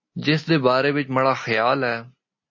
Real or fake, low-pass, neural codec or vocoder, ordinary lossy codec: real; 7.2 kHz; none; MP3, 32 kbps